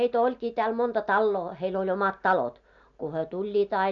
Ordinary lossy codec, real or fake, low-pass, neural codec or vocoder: none; real; 7.2 kHz; none